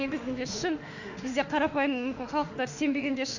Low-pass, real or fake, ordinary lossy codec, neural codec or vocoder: 7.2 kHz; fake; none; autoencoder, 48 kHz, 32 numbers a frame, DAC-VAE, trained on Japanese speech